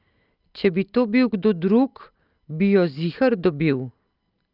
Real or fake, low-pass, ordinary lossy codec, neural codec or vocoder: real; 5.4 kHz; Opus, 24 kbps; none